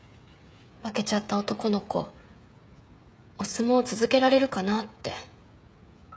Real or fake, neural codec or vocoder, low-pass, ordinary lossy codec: fake; codec, 16 kHz, 16 kbps, FreqCodec, smaller model; none; none